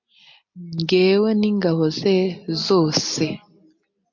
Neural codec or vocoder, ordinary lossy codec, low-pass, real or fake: none; MP3, 48 kbps; 7.2 kHz; real